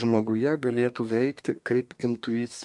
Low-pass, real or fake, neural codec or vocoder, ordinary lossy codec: 10.8 kHz; fake; codec, 24 kHz, 1 kbps, SNAC; MP3, 64 kbps